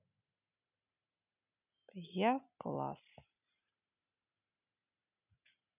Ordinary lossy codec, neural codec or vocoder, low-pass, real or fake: none; none; 3.6 kHz; real